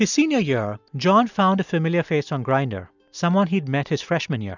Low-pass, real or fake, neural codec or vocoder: 7.2 kHz; real; none